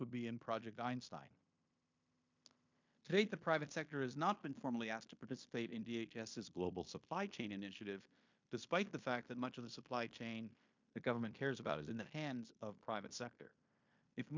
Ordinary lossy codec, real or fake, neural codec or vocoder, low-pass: MP3, 64 kbps; fake; codec, 16 kHz in and 24 kHz out, 0.9 kbps, LongCat-Audio-Codec, fine tuned four codebook decoder; 7.2 kHz